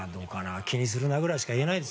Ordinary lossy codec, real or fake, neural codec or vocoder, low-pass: none; real; none; none